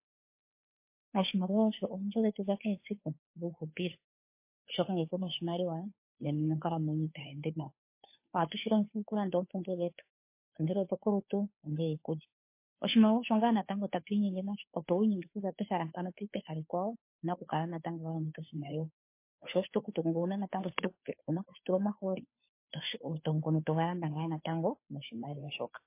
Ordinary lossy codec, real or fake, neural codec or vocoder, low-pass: MP3, 24 kbps; fake; codec, 16 kHz, 2 kbps, FunCodec, trained on Chinese and English, 25 frames a second; 3.6 kHz